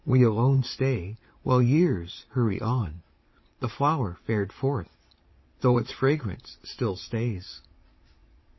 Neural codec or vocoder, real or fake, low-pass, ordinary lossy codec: vocoder, 22.05 kHz, 80 mel bands, Vocos; fake; 7.2 kHz; MP3, 24 kbps